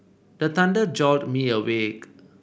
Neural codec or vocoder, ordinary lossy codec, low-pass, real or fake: none; none; none; real